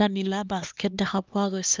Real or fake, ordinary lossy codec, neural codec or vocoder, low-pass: fake; Opus, 24 kbps; codec, 16 kHz, 2 kbps, X-Codec, HuBERT features, trained on LibriSpeech; 7.2 kHz